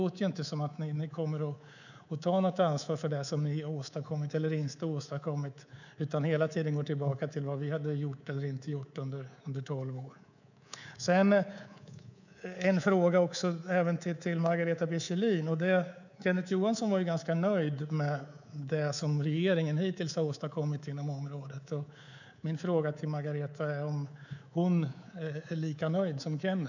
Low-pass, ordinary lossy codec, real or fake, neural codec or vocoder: 7.2 kHz; none; fake; codec, 24 kHz, 3.1 kbps, DualCodec